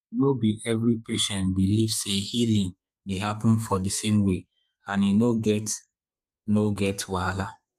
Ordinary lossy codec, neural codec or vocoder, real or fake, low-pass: none; codec, 32 kHz, 1.9 kbps, SNAC; fake; 14.4 kHz